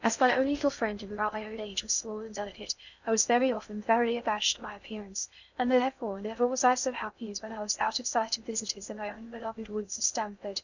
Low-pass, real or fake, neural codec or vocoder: 7.2 kHz; fake; codec, 16 kHz in and 24 kHz out, 0.6 kbps, FocalCodec, streaming, 2048 codes